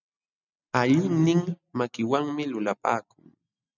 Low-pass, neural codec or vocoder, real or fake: 7.2 kHz; none; real